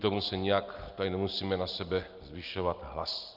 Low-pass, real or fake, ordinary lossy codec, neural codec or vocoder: 5.4 kHz; real; Opus, 24 kbps; none